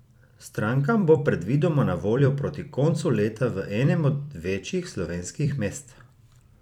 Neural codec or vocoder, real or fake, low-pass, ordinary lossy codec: vocoder, 44.1 kHz, 128 mel bands every 256 samples, BigVGAN v2; fake; 19.8 kHz; none